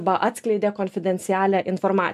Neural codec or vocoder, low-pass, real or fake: none; 14.4 kHz; real